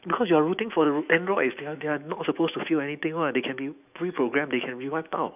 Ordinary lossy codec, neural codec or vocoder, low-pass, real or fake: none; autoencoder, 48 kHz, 128 numbers a frame, DAC-VAE, trained on Japanese speech; 3.6 kHz; fake